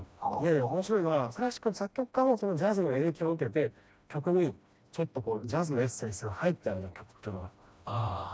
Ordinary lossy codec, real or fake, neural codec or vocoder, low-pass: none; fake; codec, 16 kHz, 1 kbps, FreqCodec, smaller model; none